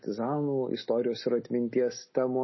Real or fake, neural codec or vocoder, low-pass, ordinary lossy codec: real; none; 7.2 kHz; MP3, 24 kbps